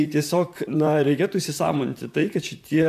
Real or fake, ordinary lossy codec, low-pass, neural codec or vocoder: fake; MP3, 96 kbps; 14.4 kHz; vocoder, 44.1 kHz, 128 mel bands, Pupu-Vocoder